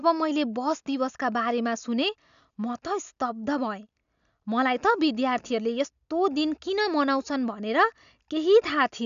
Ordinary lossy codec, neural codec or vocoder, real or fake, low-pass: none; none; real; 7.2 kHz